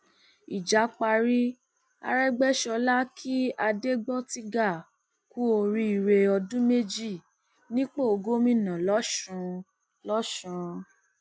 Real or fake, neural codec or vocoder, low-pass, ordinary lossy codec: real; none; none; none